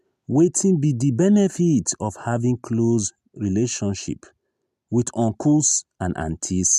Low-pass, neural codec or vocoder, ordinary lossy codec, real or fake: 14.4 kHz; none; MP3, 96 kbps; real